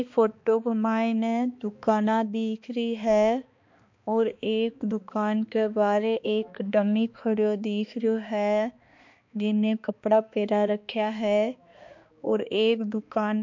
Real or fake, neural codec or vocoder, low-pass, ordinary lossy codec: fake; codec, 16 kHz, 2 kbps, X-Codec, HuBERT features, trained on balanced general audio; 7.2 kHz; MP3, 48 kbps